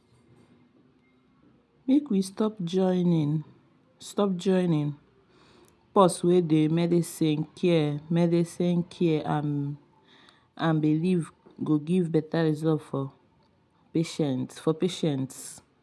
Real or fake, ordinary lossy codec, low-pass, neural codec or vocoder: real; none; none; none